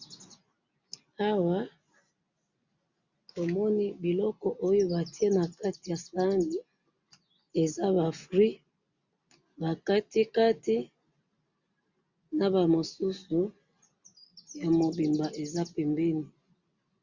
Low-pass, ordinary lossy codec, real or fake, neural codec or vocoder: 7.2 kHz; Opus, 64 kbps; real; none